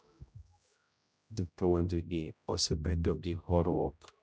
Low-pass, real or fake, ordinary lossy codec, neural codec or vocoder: none; fake; none; codec, 16 kHz, 0.5 kbps, X-Codec, HuBERT features, trained on general audio